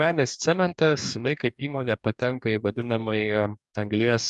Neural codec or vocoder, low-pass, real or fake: codec, 44.1 kHz, 2.6 kbps, DAC; 10.8 kHz; fake